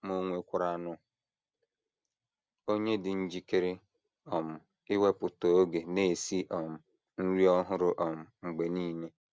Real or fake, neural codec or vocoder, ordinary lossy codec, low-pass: real; none; none; none